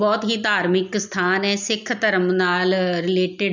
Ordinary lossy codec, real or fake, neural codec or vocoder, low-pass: none; real; none; 7.2 kHz